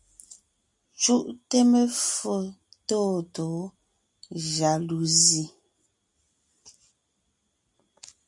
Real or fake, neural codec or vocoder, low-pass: real; none; 10.8 kHz